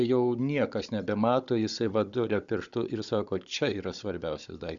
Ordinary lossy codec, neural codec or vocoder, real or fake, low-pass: Opus, 64 kbps; codec, 16 kHz, 16 kbps, FunCodec, trained on Chinese and English, 50 frames a second; fake; 7.2 kHz